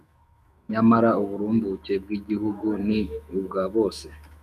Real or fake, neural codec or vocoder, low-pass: fake; autoencoder, 48 kHz, 128 numbers a frame, DAC-VAE, trained on Japanese speech; 14.4 kHz